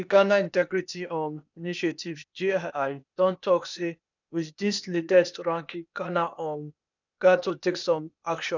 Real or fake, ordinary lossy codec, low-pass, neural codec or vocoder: fake; none; 7.2 kHz; codec, 16 kHz, 0.8 kbps, ZipCodec